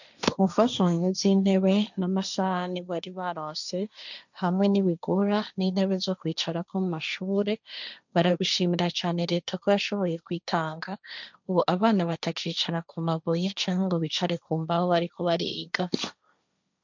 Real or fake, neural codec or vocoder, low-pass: fake; codec, 16 kHz, 1.1 kbps, Voila-Tokenizer; 7.2 kHz